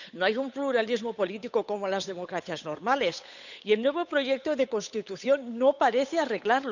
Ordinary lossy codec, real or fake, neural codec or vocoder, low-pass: none; fake; codec, 16 kHz, 8 kbps, FunCodec, trained on Chinese and English, 25 frames a second; 7.2 kHz